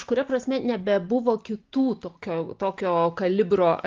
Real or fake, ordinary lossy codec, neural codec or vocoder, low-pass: real; Opus, 24 kbps; none; 7.2 kHz